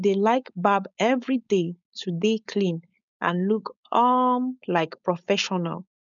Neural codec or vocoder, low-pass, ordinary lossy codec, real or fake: codec, 16 kHz, 4.8 kbps, FACodec; 7.2 kHz; none; fake